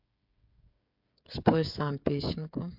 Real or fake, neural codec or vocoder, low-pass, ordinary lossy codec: fake; codec, 16 kHz, 8 kbps, FreqCodec, smaller model; 5.4 kHz; MP3, 48 kbps